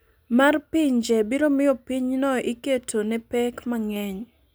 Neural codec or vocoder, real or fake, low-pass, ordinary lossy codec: none; real; none; none